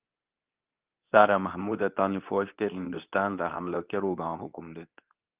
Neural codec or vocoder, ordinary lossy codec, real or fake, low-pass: codec, 24 kHz, 0.9 kbps, WavTokenizer, medium speech release version 2; Opus, 24 kbps; fake; 3.6 kHz